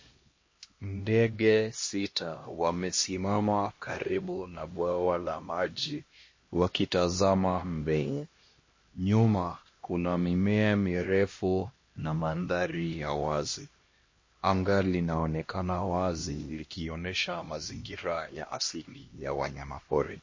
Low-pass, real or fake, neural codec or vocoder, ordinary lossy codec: 7.2 kHz; fake; codec, 16 kHz, 1 kbps, X-Codec, HuBERT features, trained on LibriSpeech; MP3, 32 kbps